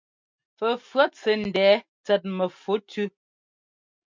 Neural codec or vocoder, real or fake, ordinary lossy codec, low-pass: none; real; MP3, 64 kbps; 7.2 kHz